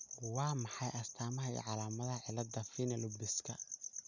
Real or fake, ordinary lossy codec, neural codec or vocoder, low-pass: real; none; none; 7.2 kHz